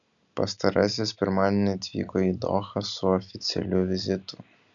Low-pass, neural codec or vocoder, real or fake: 7.2 kHz; none; real